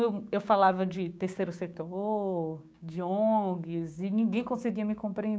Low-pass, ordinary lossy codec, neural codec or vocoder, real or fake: none; none; codec, 16 kHz, 6 kbps, DAC; fake